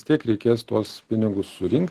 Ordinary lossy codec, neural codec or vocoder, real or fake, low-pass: Opus, 16 kbps; none; real; 14.4 kHz